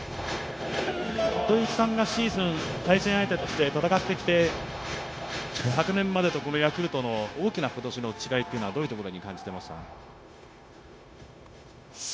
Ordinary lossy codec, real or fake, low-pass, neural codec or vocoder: none; fake; none; codec, 16 kHz, 0.9 kbps, LongCat-Audio-Codec